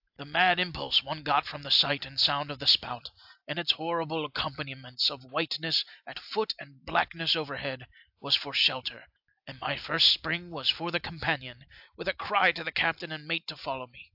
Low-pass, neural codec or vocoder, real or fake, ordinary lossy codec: 5.4 kHz; none; real; AAC, 48 kbps